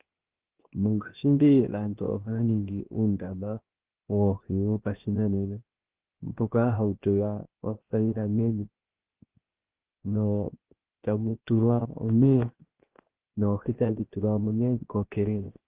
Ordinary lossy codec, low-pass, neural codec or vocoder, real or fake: Opus, 16 kbps; 3.6 kHz; codec, 16 kHz, 0.7 kbps, FocalCodec; fake